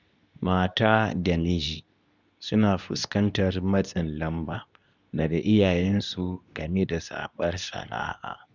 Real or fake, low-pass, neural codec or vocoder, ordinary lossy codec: fake; 7.2 kHz; codec, 24 kHz, 0.9 kbps, WavTokenizer, medium speech release version 2; none